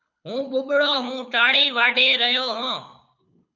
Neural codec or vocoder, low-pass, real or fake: codec, 24 kHz, 6 kbps, HILCodec; 7.2 kHz; fake